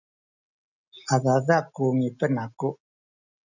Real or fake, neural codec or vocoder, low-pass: real; none; 7.2 kHz